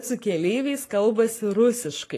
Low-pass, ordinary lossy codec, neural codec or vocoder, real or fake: 14.4 kHz; AAC, 48 kbps; codec, 44.1 kHz, 7.8 kbps, Pupu-Codec; fake